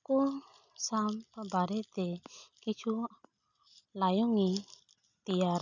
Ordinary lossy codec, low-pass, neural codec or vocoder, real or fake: none; 7.2 kHz; none; real